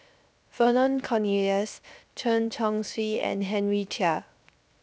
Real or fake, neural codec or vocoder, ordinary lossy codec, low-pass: fake; codec, 16 kHz, 0.7 kbps, FocalCodec; none; none